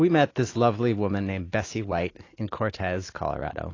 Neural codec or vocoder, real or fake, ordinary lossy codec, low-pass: none; real; AAC, 32 kbps; 7.2 kHz